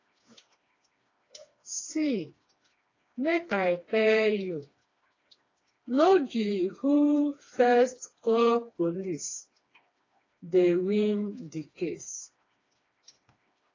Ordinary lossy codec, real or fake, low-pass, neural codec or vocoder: AAC, 32 kbps; fake; 7.2 kHz; codec, 16 kHz, 2 kbps, FreqCodec, smaller model